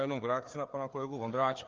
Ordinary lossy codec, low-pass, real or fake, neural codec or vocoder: Opus, 24 kbps; 7.2 kHz; fake; codec, 16 kHz, 4 kbps, FreqCodec, larger model